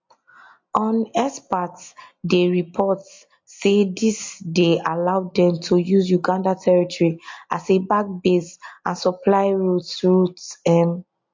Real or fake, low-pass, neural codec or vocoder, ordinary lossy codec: real; 7.2 kHz; none; MP3, 48 kbps